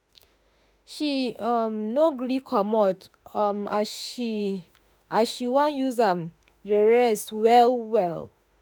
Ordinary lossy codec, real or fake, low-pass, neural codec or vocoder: none; fake; none; autoencoder, 48 kHz, 32 numbers a frame, DAC-VAE, trained on Japanese speech